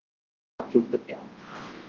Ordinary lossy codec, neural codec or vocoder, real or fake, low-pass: Opus, 16 kbps; codec, 24 kHz, 0.9 kbps, WavTokenizer, large speech release; fake; 7.2 kHz